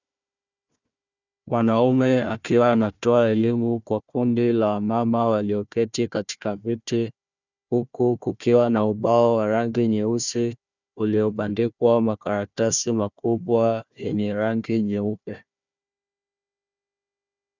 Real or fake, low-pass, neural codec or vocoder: fake; 7.2 kHz; codec, 16 kHz, 1 kbps, FunCodec, trained on Chinese and English, 50 frames a second